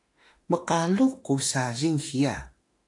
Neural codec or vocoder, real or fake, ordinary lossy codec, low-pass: autoencoder, 48 kHz, 32 numbers a frame, DAC-VAE, trained on Japanese speech; fake; AAC, 48 kbps; 10.8 kHz